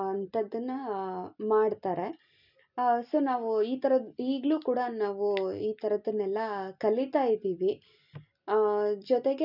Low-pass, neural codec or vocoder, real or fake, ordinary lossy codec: 5.4 kHz; none; real; none